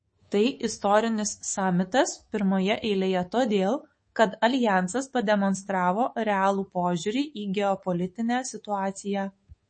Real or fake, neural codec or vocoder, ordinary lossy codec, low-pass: fake; codec, 24 kHz, 3.1 kbps, DualCodec; MP3, 32 kbps; 9.9 kHz